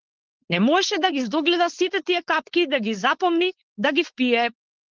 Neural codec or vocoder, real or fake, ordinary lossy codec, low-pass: codec, 16 kHz, 4.8 kbps, FACodec; fake; Opus, 16 kbps; 7.2 kHz